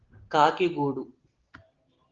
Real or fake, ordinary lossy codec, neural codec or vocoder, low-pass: real; Opus, 16 kbps; none; 7.2 kHz